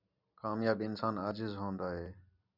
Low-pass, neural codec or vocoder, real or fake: 5.4 kHz; none; real